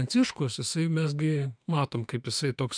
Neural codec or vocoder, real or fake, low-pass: autoencoder, 48 kHz, 32 numbers a frame, DAC-VAE, trained on Japanese speech; fake; 9.9 kHz